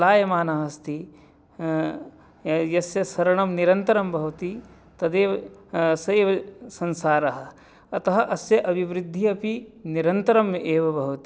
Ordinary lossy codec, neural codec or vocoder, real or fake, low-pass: none; none; real; none